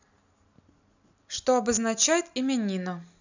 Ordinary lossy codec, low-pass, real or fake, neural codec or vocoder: none; 7.2 kHz; real; none